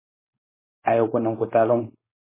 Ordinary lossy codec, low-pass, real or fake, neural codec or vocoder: MP3, 16 kbps; 3.6 kHz; fake; codec, 16 kHz, 4.8 kbps, FACodec